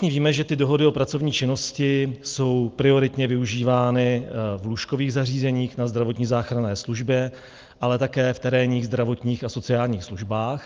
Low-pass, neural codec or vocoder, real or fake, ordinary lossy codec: 7.2 kHz; none; real; Opus, 24 kbps